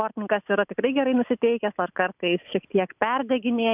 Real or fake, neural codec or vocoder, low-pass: real; none; 3.6 kHz